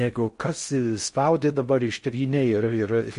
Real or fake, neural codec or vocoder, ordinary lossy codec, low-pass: fake; codec, 16 kHz in and 24 kHz out, 0.6 kbps, FocalCodec, streaming, 4096 codes; MP3, 48 kbps; 10.8 kHz